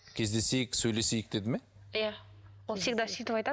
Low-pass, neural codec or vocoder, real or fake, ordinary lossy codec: none; none; real; none